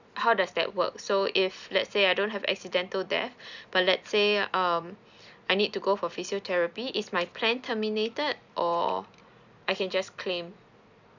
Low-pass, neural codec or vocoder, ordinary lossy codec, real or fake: 7.2 kHz; none; none; real